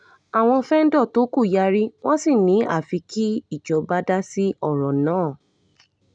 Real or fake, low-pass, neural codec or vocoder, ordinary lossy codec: real; 9.9 kHz; none; none